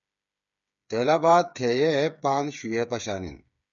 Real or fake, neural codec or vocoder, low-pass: fake; codec, 16 kHz, 8 kbps, FreqCodec, smaller model; 7.2 kHz